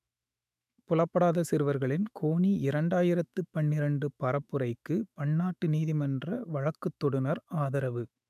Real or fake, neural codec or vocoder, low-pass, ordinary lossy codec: fake; autoencoder, 48 kHz, 128 numbers a frame, DAC-VAE, trained on Japanese speech; 14.4 kHz; none